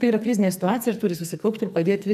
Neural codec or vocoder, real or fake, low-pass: codec, 44.1 kHz, 2.6 kbps, SNAC; fake; 14.4 kHz